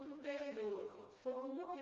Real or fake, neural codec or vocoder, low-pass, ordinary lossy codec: fake; codec, 16 kHz, 1 kbps, FreqCodec, smaller model; 7.2 kHz; Opus, 32 kbps